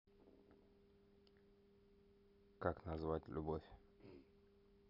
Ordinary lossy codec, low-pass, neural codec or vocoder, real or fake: none; 5.4 kHz; none; real